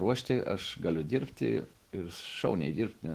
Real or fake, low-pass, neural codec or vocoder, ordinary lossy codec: fake; 14.4 kHz; autoencoder, 48 kHz, 128 numbers a frame, DAC-VAE, trained on Japanese speech; Opus, 16 kbps